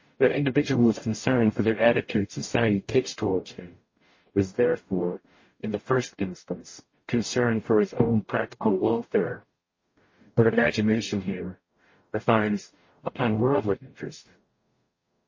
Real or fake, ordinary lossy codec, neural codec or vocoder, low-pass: fake; MP3, 32 kbps; codec, 44.1 kHz, 0.9 kbps, DAC; 7.2 kHz